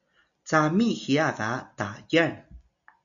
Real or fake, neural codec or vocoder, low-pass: real; none; 7.2 kHz